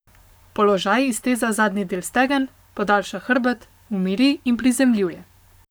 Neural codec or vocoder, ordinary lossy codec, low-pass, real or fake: codec, 44.1 kHz, 7.8 kbps, Pupu-Codec; none; none; fake